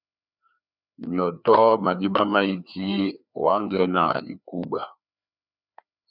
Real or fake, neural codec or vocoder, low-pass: fake; codec, 16 kHz, 2 kbps, FreqCodec, larger model; 5.4 kHz